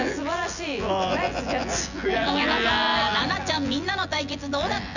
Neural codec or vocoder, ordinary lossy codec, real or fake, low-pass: vocoder, 24 kHz, 100 mel bands, Vocos; none; fake; 7.2 kHz